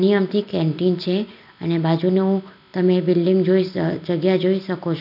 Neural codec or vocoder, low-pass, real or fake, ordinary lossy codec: none; 5.4 kHz; real; none